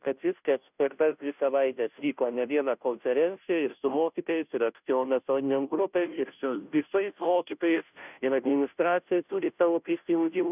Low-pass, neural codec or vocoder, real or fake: 3.6 kHz; codec, 16 kHz, 0.5 kbps, FunCodec, trained on Chinese and English, 25 frames a second; fake